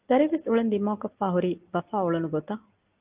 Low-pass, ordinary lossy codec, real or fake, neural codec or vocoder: 3.6 kHz; Opus, 32 kbps; real; none